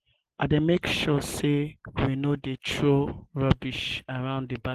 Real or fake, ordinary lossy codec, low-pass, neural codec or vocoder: real; Opus, 16 kbps; 14.4 kHz; none